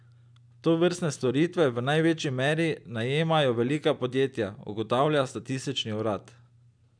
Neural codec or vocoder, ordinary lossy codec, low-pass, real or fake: none; none; 9.9 kHz; real